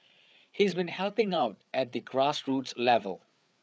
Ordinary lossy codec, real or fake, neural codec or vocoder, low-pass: none; fake; codec, 16 kHz, 8 kbps, FreqCodec, larger model; none